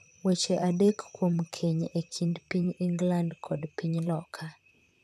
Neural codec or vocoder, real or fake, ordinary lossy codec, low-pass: vocoder, 44.1 kHz, 128 mel bands, Pupu-Vocoder; fake; none; 14.4 kHz